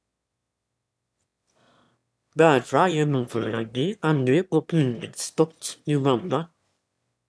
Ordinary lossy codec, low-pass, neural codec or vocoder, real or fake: none; none; autoencoder, 22.05 kHz, a latent of 192 numbers a frame, VITS, trained on one speaker; fake